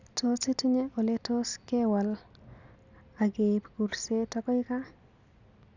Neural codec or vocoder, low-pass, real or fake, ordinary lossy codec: none; 7.2 kHz; real; none